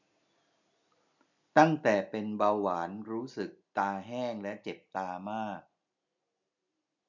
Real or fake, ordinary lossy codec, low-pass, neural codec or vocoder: real; none; 7.2 kHz; none